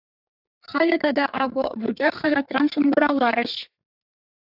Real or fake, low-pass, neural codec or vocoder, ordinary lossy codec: fake; 5.4 kHz; codec, 32 kHz, 1.9 kbps, SNAC; AAC, 48 kbps